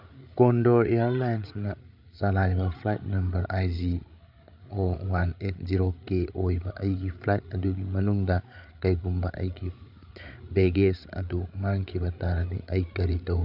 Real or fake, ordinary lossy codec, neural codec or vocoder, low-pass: fake; none; codec, 16 kHz, 8 kbps, FreqCodec, larger model; 5.4 kHz